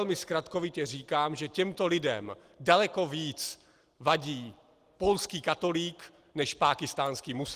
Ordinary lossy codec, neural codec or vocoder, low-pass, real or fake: Opus, 24 kbps; none; 14.4 kHz; real